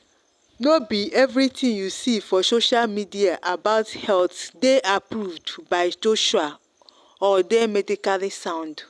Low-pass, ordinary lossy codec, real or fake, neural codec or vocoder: none; none; real; none